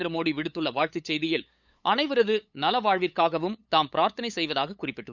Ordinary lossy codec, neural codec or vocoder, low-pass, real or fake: none; codec, 16 kHz, 8 kbps, FunCodec, trained on LibriTTS, 25 frames a second; 7.2 kHz; fake